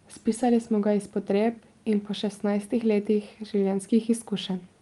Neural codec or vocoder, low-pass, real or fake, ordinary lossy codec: none; 10.8 kHz; real; Opus, 32 kbps